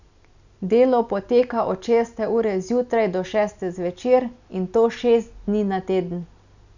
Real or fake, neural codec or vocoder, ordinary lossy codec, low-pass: real; none; none; 7.2 kHz